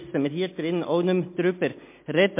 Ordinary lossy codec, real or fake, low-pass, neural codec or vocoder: MP3, 24 kbps; real; 3.6 kHz; none